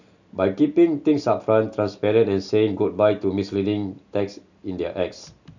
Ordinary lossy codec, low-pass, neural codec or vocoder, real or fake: none; 7.2 kHz; none; real